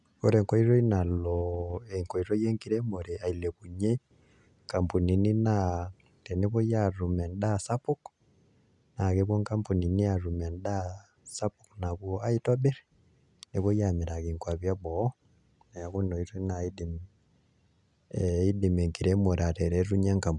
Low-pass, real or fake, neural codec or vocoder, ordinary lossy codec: 10.8 kHz; real; none; none